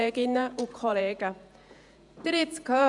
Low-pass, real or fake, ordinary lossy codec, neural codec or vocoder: 10.8 kHz; fake; none; vocoder, 48 kHz, 128 mel bands, Vocos